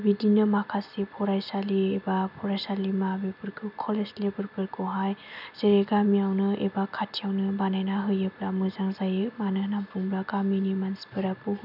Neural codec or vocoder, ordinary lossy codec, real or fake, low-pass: none; none; real; 5.4 kHz